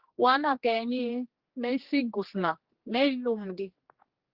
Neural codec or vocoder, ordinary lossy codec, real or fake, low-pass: codec, 16 kHz, 2 kbps, X-Codec, HuBERT features, trained on general audio; Opus, 16 kbps; fake; 5.4 kHz